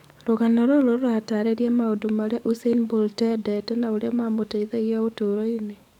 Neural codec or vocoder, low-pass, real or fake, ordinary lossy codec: codec, 44.1 kHz, 7.8 kbps, DAC; 19.8 kHz; fake; none